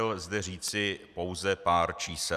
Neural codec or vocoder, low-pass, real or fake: none; 14.4 kHz; real